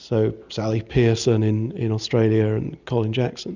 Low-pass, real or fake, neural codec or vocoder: 7.2 kHz; real; none